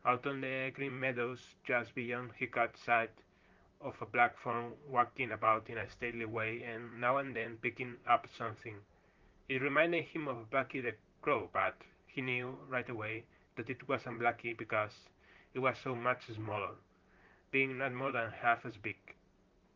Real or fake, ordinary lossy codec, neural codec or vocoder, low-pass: fake; Opus, 24 kbps; vocoder, 44.1 kHz, 128 mel bands, Pupu-Vocoder; 7.2 kHz